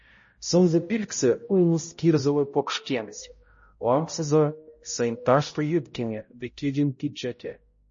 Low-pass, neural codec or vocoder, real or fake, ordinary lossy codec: 7.2 kHz; codec, 16 kHz, 0.5 kbps, X-Codec, HuBERT features, trained on balanced general audio; fake; MP3, 32 kbps